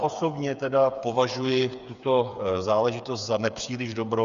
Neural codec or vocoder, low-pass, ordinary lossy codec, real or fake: codec, 16 kHz, 8 kbps, FreqCodec, smaller model; 7.2 kHz; Opus, 64 kbps; fake